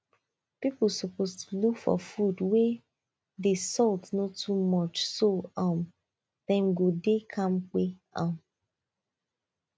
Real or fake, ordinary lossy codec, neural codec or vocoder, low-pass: real; none; none; none